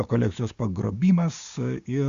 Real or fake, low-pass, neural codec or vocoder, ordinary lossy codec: fake; 7.2 kHz; codec, 16 kHz, 6 kbps, DAC; Opus, 64 kbps